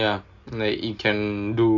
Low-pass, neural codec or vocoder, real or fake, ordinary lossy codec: 7.2 kHz; none; real; none